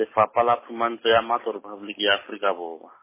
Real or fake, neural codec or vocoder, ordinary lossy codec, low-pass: real; none; MP3, 16 kbps; 3.6 kHz